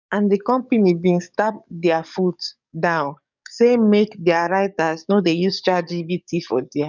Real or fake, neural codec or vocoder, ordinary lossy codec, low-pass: fake; codec, 44.1 kHz, 7.8 kbps, DAC; none; 7.2 kHz